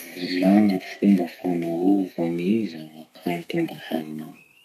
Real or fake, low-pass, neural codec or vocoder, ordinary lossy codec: fake; 14.4 kHz; codec, 32 kHz, 1.9 kbps, SNAC; none